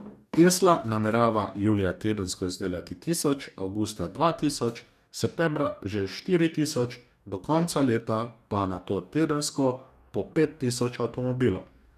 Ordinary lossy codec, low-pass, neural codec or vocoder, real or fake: none; 14.4 kHz; codec, 44.1 kHz, 2.6 kbps, DAC; fake